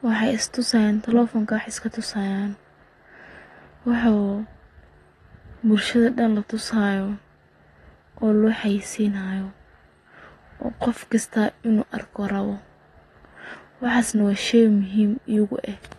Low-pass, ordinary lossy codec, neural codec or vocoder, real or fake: 19.8 kHz; AAC, 32 kbps; none; real